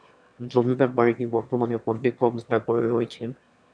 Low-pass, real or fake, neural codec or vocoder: 9.9 kHz; fake; autoencoder, 22.05 kHz, a latent of 192 numbers a frame, VITS, trained on one speaker